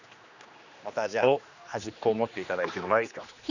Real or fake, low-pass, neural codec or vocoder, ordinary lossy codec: fake; 7.2 kHz; codec, 16 kHz, 2 kbps, X-Codec, HuBERT features, trained on general audio; none